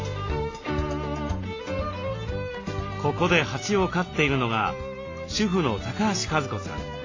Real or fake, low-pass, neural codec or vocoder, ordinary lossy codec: real; 7.2 kHz; none; AAC, 32 kbps